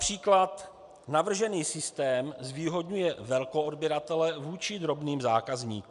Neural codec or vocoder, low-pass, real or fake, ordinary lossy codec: none; 10.8 kHz; real; MP3, 96 kbps